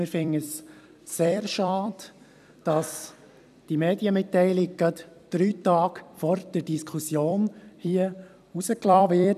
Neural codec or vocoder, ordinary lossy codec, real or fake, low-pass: vocoder, 44.1 kHz, 128 mel bands every 256 samples, BigVGAN v2; none; fake; 14.4 kHz